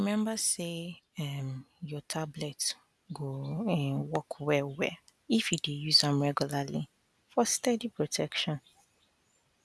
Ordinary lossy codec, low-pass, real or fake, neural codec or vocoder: none; none; real; none